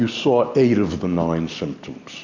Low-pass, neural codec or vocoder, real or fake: 7.2 kHz; none; real